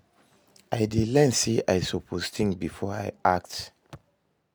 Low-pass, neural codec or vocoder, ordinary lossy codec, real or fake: none; none; none; real